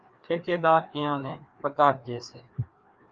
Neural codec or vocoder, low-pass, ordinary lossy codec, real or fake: codec, 16 kHz, 2 kbps, FreqCodec, larger model; 7.2 kHz; Opus, 24 kbps; fake